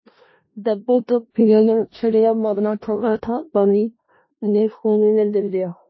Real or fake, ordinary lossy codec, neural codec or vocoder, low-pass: fake; MP3, 24 kbps; codec, 16 kHz in and 24 kHz out, 0.4 kbps, LongCat-Audio-Codec, four codebook decoder; 7.2 kHz